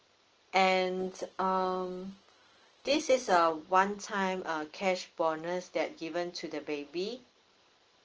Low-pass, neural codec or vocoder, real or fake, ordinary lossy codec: 7.2 kHz; none; real; Opus, 16 kbps